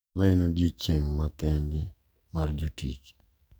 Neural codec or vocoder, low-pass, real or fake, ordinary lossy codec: codec, 44.1 kHz, 2.6 kbps, SNAC; none; fake; none